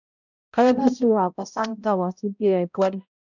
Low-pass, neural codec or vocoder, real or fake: 7.2 kHz; codec, 16 kHz, 0.5 kbps, X-Codec, HuBERT features, trained on balanced general audio; fake